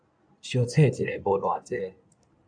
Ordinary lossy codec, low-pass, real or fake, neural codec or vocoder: AAC, 64 kbps; 9.9 kHz; fake; codec, 16 kHz in and 24 kHz out, 2.2 kbps, FireRedTTS-2 codec